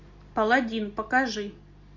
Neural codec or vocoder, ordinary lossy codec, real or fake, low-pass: none; MP3, 48 kbps; real; 7.2 kHz